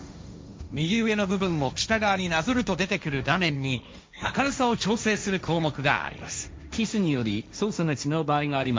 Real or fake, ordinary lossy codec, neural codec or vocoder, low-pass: fake; none; codec, 16 kHz, 1.1 kbps, Voila-Tokenizer; none